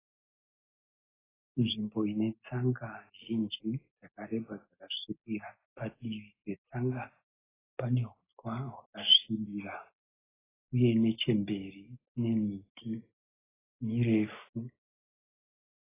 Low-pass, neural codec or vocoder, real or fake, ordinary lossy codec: 3.6 kHz; none; real; AAC, 16 kbps